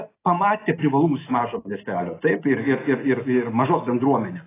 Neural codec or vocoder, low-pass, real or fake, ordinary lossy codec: none; 3.6 kHz; real; AAC, 16 kbps